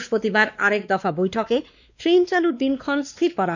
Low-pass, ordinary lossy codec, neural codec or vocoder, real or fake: 7.2 kHz; none; codec, 16 kHz, 2 kbps, X-Codec, WavLM features, trained on Multilingual LibriSpeech; fake